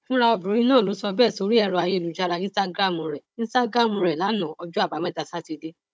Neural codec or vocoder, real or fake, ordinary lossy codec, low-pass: codec, 16 kHz, 16 kbps, FunCodec, trained on Chinese and English, 50 frames a second; fake; none; none